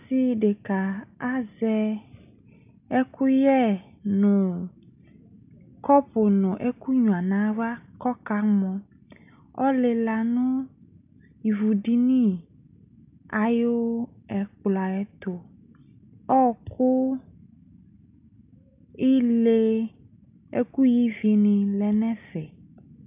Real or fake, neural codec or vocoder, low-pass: real; none; 3.6 kHz